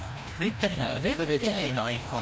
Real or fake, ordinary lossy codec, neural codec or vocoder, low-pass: fake; none; codec, 16 kHz, 1 kbps, FreqCodec, larger model; none